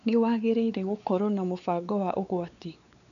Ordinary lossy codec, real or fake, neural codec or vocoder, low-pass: none; fake; codec, 16 kHz, 4 kbps, X-Codec, WavLM features, trained on Multilingual LibriSpeech; 7.2 kHz